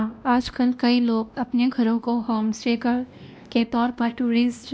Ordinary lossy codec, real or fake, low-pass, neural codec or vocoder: none; fake; none; codec, 16 kHz, 1 kbps, X-Codec, WavLM features, trained on Multilingual LibriSpeech